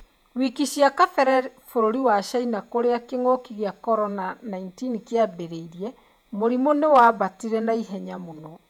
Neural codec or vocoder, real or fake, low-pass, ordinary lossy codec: vocoder, 48 kHz, 128 mel bands, Vocos; fake; 19.8 kHz; none